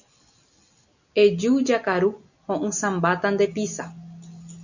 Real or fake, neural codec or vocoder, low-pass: real; none; 7.2 kHz